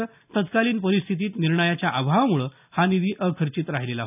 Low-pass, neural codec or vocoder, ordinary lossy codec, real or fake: 3.6 kHz; none; none; real